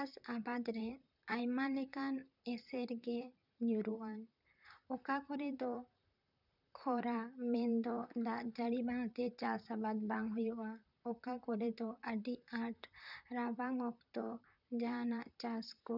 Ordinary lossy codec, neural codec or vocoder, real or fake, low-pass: none; vocoder, 44.1 kHz, 128 mel bands, Pupu-Vocoder; fake; 5.4 kHz